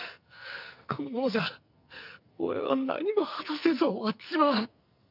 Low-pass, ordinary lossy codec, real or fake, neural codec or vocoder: 5.4 kHz; none; fake; codec, 44.1 kHz, 2.6 kbps, SNAC